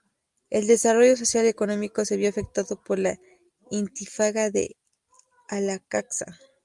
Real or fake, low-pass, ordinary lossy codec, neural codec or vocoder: real; 10.8 kHz; Opus, 32 kbps; none